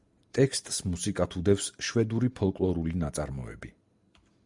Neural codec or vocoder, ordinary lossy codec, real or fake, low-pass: none; Opus, 64 kbps; real; 10.8 kHz